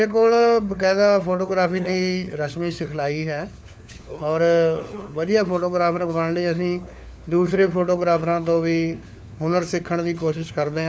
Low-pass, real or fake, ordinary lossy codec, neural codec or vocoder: none; fake; none; codec, 16 kHz, 4 kbps, FunCodec, trained on LibriTTS, 50 frames a second